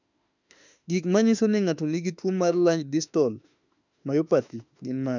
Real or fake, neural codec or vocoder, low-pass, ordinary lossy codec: fake; autoencoder, 48 kHz, 32 numbers a frame, DAC-VAE, trained on Japanese speech; 7.2 kHz; none